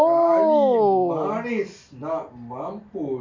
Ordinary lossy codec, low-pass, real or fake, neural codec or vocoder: MP3, 64 kbps; 7.2 kHz; real; none